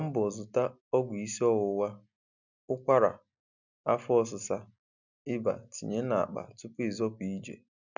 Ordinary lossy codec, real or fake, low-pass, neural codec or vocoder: none; real; 7.2 kHz; none